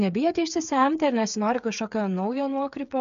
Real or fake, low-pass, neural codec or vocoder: fake; 7.2 kHz; codec, 16 kHz, 8 kbps, FreqCodec, smaller model